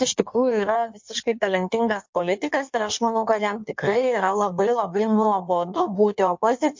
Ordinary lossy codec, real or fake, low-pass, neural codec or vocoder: MP3, 48 kbps; fake; 7.2 kHz; codec, 16 kHz in and 24 kHz out, 1.1 kbps, FireRedTTS-2 codec